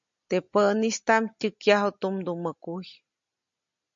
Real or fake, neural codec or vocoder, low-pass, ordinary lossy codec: real; none; 7.2 kHz; MP3, 48 kbps